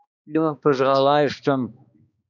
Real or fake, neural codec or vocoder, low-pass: fake; codec, 16 kHz, 2 kbps, X-Codec, HuBERT features, trained on balanced general audio; 7.2 kHz